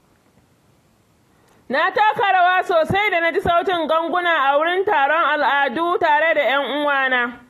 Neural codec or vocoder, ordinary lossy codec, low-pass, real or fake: none; AAC, 48 kbps; 14.4 kHz; real